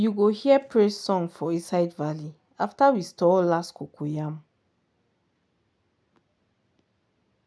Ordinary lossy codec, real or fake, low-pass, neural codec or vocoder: none; real; none; none